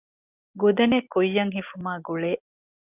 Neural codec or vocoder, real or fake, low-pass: none; real; 3.6 kHz